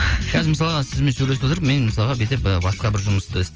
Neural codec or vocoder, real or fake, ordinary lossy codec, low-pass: none; real; Opus, 24 kbps; 7.2 kHz